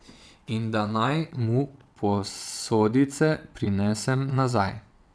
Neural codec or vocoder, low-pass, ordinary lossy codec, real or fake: vocoder, 22.05 kHz, 80 mel bands, WaveNeXt; none; none; fake